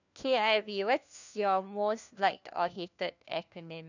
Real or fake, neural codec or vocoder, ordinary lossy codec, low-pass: fake; codec, 16 kHz, 1 kbps, FunCodec, trained on LibriTTS, 50 frames a second; none; 7.2 kHz